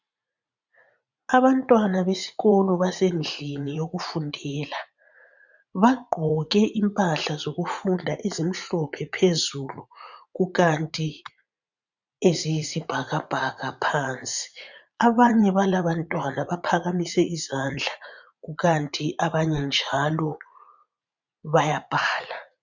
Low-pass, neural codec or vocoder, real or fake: 7.2 kHz; vocoder, 44.1 kHz, 80 mel bands, Vocos; fake